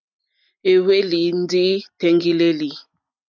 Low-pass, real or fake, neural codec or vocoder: 7.2 kHz; real; none